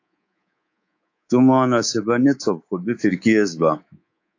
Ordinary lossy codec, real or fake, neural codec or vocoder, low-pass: AAC, 48 kbps; fake; codec, 24 kHz, 3.1 kbps, DualCodec; 7.2 kHz